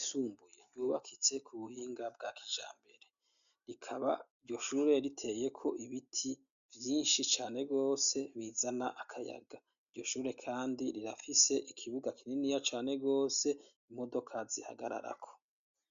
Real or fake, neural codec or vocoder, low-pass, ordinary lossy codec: real; none; 7.2 kHz; AAC, 96 kbps